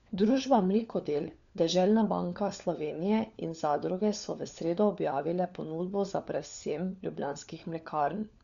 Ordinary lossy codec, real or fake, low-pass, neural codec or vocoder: none; fake; 7.2 kHz; codec, 16 kHz, 4 kbps, FunCodec, trained on LibriTTS, 50 frames a second